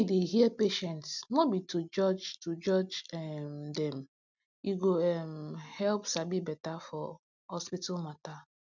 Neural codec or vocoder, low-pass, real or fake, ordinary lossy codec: none; 7.2 kHz; real; none